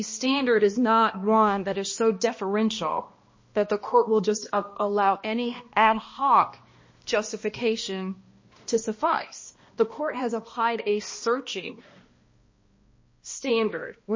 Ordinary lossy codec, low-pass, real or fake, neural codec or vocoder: MP3, 32 kbps; 7.2 kHz; fake; codec, 16 kHz, 1 kbps, X-Codec, HuBERT features, trained on balanced general audio